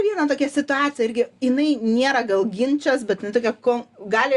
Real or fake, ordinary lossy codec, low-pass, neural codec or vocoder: real; Opus, 64 kbps; 10.8 kHz; none